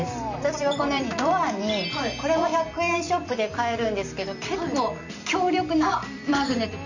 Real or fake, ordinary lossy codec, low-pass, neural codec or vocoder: fake; none; 7.2 kHz; vocoder, 44.1 kHz, 128 mel bands every 256 samples, BigVGAN v2